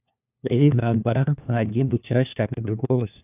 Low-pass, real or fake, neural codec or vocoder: 3.6 kHz; fake; codec, 16 kHz, 1 kbps, FunCodec, trained on LibriTTS, 50 frames a second